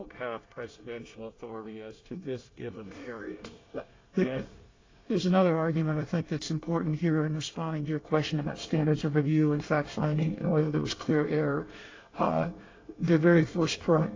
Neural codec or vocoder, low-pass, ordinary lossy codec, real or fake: codec, 24 kHz, 1 kbps, SNAC; 7.2 kHz; AAC, 32 kbps; fake